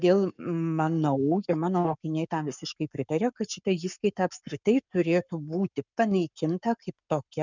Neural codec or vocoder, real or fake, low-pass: codec, 44.1 kHz, 7.8 kbps, Pupu-Codec; fake; 7.2 kHz